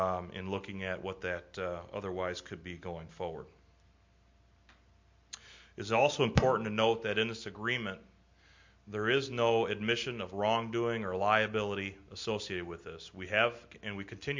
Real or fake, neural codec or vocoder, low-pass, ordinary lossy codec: real; none; 7.2 kHz; MP3, 48 kbps